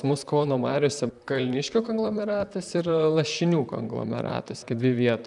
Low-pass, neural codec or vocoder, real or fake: 10.8 kHz; vocoder, 44.1 kHz, 128 mel bands, Pupu-Vocoder; fake